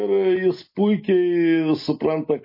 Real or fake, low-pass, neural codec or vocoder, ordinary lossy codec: real; 5.4 kHz; none; MP3, 24 kbps